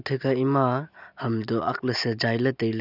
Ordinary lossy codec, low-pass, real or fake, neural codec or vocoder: none; 5.4 kHz; real; none